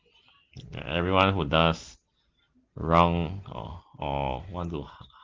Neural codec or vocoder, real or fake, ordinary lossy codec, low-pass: none; real; Opus, 16 kbps; 7.2 kHz